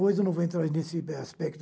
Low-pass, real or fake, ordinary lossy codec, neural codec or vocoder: none; real; none; none